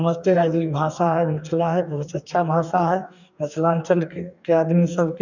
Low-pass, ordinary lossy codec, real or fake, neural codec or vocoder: 7.2 kHz; none; fake; codec, 44.1 kHz, 2.6 kbps, DAC